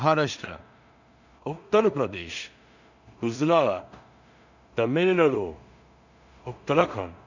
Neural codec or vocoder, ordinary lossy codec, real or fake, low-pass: codec, 16 kHz in and 24 kHz out, 0.4 kbps, LongCat-Audio-Codec, two codebook decoder; none; fake; 7.2 kHz